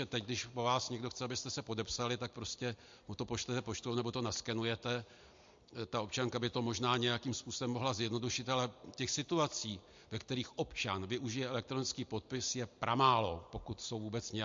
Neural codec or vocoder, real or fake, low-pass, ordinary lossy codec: none; real; 7.2 kHz; MP3, 48 kbps